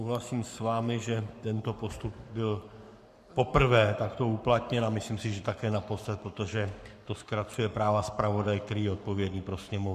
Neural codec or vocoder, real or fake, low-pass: codec, 44.1 kHz, 7.8 kbps, Pupu-Codec; fake; 14.4 kHz